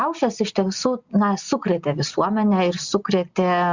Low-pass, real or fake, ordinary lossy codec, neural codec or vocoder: 7.2 kHz; real; Opus, 64 kbps; none